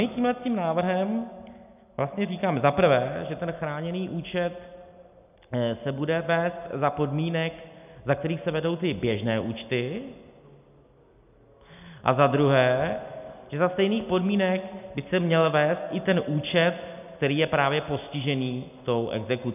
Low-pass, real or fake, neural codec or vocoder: 3.6 kHz; real; none